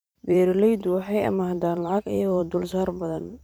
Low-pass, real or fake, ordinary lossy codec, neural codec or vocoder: none; fake; none; vocoder, 44.1 kHz, 128 mel bands every 512 samples, BigVGAN v2